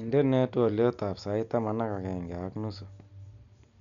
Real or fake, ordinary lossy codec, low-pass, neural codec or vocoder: real; none; 7.2 kHz; none